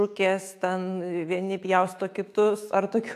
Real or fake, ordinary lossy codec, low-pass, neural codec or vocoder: fake; AAC, 64 kbps; 14.4 kHz; autoencoder, 48 kHz, 128 numbers a frame, DAC-VAE, trained on Japanese speech